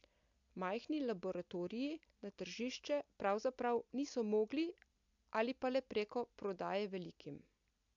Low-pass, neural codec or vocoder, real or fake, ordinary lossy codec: 7.2 kHz; none; real; AAC, 64 kbps